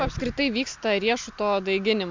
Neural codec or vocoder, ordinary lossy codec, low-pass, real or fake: none; MP3, 64 kbps; 7.2 kHz; real